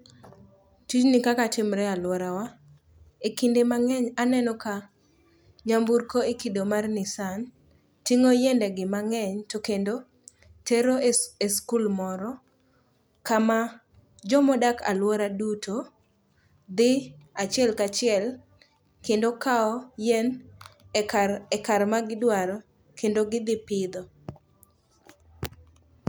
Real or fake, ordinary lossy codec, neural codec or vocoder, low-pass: real; none; none; none